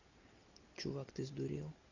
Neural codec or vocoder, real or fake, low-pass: none; real; 7.2 kHz